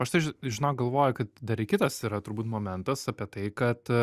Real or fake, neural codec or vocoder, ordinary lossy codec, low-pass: real; none; Opus, 64 kbps; 14.4 kHz